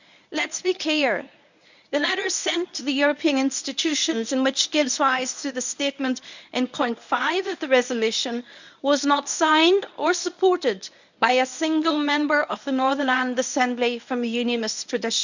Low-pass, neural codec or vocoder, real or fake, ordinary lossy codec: 7.2 kHz; codec, 24 kHz, 0.9 kbps, WavTokenizer, medium speech release version 1; fake; none